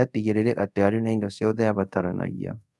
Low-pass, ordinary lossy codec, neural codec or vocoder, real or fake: none; none; codec, 24 kHz, 0.5 kbps, DualCodec; fake